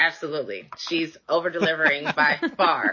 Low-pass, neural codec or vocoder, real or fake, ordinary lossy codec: 7.2 kHz; none; real; MP3, 32 kbps